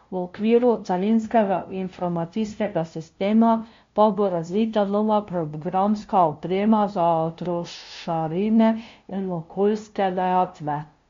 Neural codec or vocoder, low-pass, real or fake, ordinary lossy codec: codec, 16 kHz, 0.5 kbps, FunCodec, trained on LibriTTS, 25 frames a second; 7.2 kHz; fake; MP3, 48 kbps